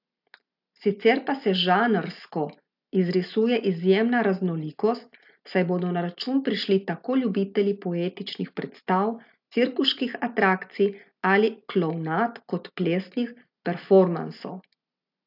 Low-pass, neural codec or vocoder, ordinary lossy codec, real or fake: 5.4 kHz; none; AAC, 48 kbps; real